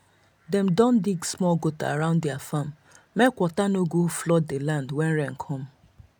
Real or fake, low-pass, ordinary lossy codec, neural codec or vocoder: real; none; none; none